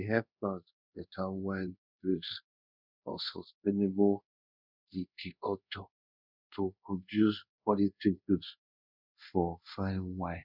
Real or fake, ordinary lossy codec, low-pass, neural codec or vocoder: fake; none; 5.4 kHz; codec, 24 kHz, 0.5 kbps, DualCodec